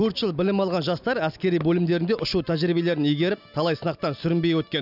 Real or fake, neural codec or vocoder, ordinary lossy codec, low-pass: real; none; none; 5.4 kHz